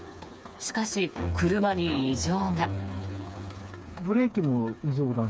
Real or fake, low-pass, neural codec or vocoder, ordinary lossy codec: fake; none; codec, 16 kHz, 4 kbps, FreqCodec, smaller model; none